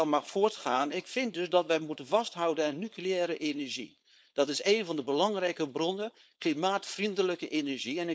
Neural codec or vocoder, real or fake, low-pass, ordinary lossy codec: codec, 16 kHz, 4.8 kbps, FACodec; fake; none; none